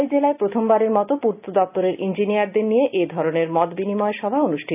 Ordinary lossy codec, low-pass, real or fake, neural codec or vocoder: none; 3.6 kHz; real; none